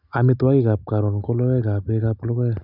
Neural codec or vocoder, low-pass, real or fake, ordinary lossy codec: none; 5.4 kHz; real; none